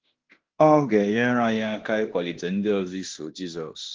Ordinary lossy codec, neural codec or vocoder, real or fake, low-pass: Opus, 16 kbps; codec, 16 kHz in and 24 kHz out, 0.9 kbps, LongCat-Audio-Codec, fine tuned four codebook decoder; fake; 7.2 kHz